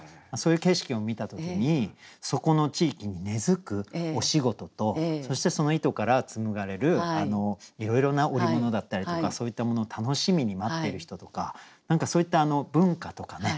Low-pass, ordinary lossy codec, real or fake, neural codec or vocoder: none; none; real; none